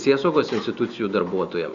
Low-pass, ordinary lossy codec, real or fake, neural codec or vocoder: 7.2 kHz; Opus, 64 kbps; real; none